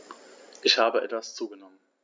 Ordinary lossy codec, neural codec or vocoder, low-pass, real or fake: none; none; none; real